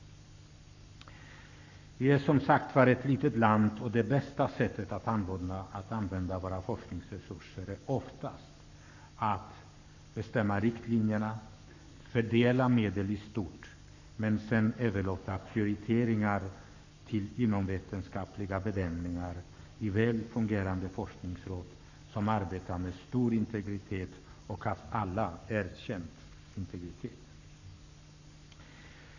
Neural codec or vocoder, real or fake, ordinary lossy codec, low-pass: codec, 44.1 kHz, 7.8 kbps, Pupu-Codec; fake; none; 7.2 kHz